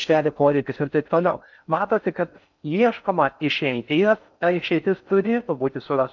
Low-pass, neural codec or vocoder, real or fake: 7.2 kHz; codec, 16 kHz in and 24 kHz out, 0.6 kbps, FocalCodec, streaming, 4096 codes; fake